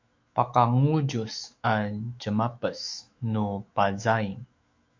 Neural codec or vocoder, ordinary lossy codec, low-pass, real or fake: autoencoder, 48 kHz, 128 numbers a frame, DAC-VAE, trained on Japanese speech; MP3, 48 kbps; 7.2 kHz; fake